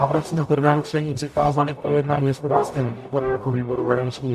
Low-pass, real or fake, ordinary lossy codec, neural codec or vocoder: 14.4 kHz; fake; AAC, 96 kbps; codec, 44.1 kHz, 0.9 kbps, DAC